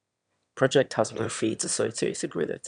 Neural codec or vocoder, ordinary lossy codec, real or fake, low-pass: autoencoder, 22.05 kHz, a latent of 192 numbers a frame, VITS, trained on one speaker; none; fake; 9.9 kHz